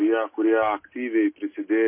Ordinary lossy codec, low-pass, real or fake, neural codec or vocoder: MP3, 24 kbps; 3.6 kHz; real; none